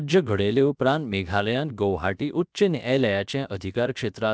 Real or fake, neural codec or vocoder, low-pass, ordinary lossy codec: fake; codec, 16 kHz, about 1 kbps, DyCAST, with the encoder's durations; none; none